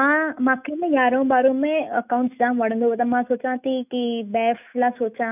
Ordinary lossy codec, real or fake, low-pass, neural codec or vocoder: none; fake; 3.6 kHz; autoencoder, 48 kHz, 128 numbers a frame, DAC-VAE, trained on Japanese speech